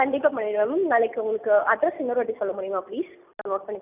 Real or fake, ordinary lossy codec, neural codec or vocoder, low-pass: real; none; none; 3.6 kHz